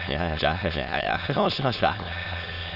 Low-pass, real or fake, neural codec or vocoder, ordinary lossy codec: 5.4 kHz; fake; autoencoder, 22.05 kHz, a latent of 192 numbers a frame, VITS, trained on many speakers; AAC, 48 kbps